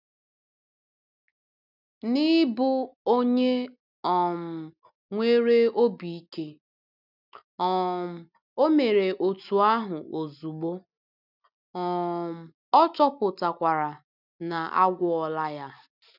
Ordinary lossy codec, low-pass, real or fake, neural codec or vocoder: none; 5.4 kHz; real; none